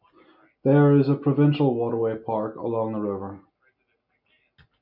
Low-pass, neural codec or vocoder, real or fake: 5.4 kHz; none; real